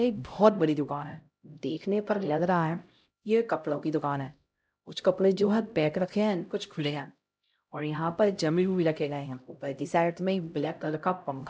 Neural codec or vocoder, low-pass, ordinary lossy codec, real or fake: codec, 16 kHz, 0.5 kbps, X-Codec, HuBERT features, trained on LibriSpeech; none; none; fake